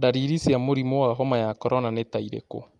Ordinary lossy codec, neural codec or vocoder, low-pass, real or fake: Opus, 32 kbps; none; 10.8 kHz; real